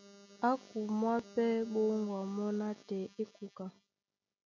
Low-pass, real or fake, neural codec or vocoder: 7.2 kHz; real; none